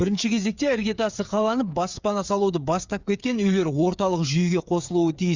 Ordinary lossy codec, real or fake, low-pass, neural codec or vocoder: Opus, 64 kbps; fake; 7.2 kHz; codec, 16 kHz, 8 kbps, FreqCodec, smaller model